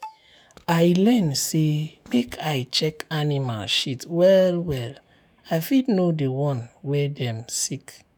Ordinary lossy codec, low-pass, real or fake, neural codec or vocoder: none; none; fake; autoencoder, 48 kHz, 128 numbers a frame, DAC-VAE, trained on Japanese speech